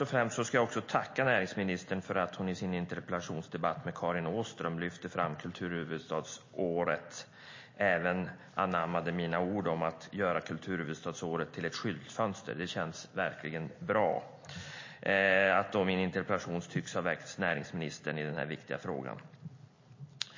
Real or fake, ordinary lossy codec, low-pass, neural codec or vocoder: real; MP3, 32 kbps; 7.2 kHz; none